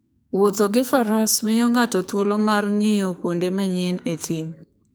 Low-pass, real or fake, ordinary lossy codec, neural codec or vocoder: none; fake; none; codec, 44.1 kHz, 2.6 kbps, SNAC